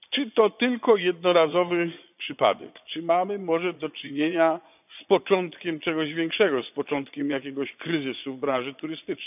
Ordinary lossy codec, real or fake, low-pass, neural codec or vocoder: none; fake; 3.6 kHz; vocoder, 22.05 kHz, 80 mel bands, Vocos